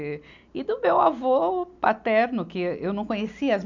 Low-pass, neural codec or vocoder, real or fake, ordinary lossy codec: 7.2 kHz; none; real; none